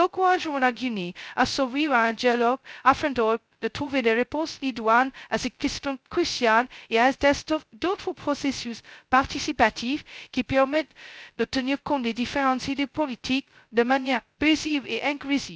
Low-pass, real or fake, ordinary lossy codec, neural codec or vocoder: none; fake; none; codec, 16 kHz, 0.2 kbps, FocalCodec